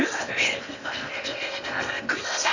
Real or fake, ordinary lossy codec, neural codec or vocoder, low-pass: fake; none; codec, 16 kHz in and 24 kHz out, 0.8 kbps, FocalCodec, streaming, 65536 codes; 7.2 kHz